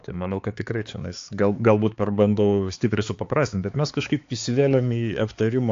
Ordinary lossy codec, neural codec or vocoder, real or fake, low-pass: AAC, 48 kbps; codec, 16 kHz, 4 kbps, X-Codec, HuBERT features, trained on balanced general audio; fake; 7.2 kHz